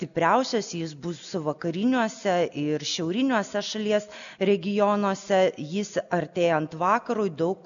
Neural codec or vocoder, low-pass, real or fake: none; 7.2 kHz; real